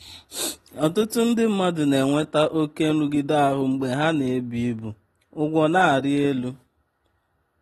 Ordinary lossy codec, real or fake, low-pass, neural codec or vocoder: AAC, 32 kbps; real; 19.8 kHz; none